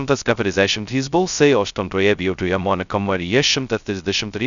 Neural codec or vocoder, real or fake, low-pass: codec, 16 kHz, 0.2 kbps, FocalCodec; fake; 7.2 kHz